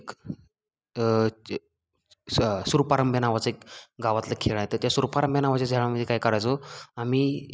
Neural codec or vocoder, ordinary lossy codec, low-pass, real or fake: none; none; none; real